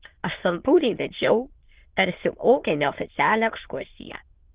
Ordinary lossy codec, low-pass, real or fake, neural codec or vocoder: Opus, 16 kbps; 3.6 kHz; fake; autoencoder, 22.05 kHz, a latent of 192 numbers a frame, VITS, trained on many speakers